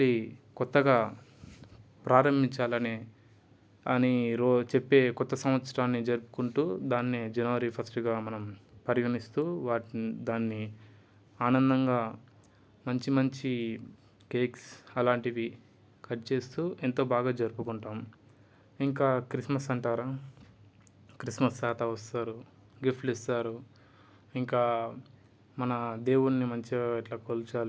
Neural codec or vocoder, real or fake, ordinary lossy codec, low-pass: none; real; none; none